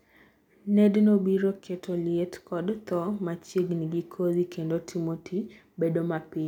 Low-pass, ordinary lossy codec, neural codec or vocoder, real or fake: 19.8 kHz; none; none; real